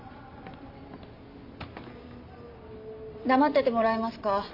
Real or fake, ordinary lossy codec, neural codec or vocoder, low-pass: real; MP3, 32 kbps; none; 5.4 kHz